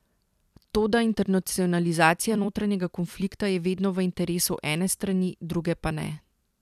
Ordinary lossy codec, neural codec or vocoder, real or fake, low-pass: none; vocoder, 44.1 kHz, 128 mel bands every 256 samples, BigVGAN v2; fake; 14.4 kHz